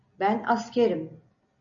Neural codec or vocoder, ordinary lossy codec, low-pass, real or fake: none; MP3, 64 kbps; 7.2 kHz; real